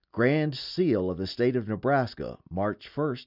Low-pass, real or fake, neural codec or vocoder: 5.4 kHz; real; none